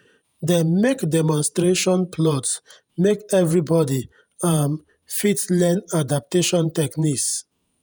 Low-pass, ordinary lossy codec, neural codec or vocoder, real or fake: none; none; vocoder, 48 kHz, 128 mel bands, Vocos; fake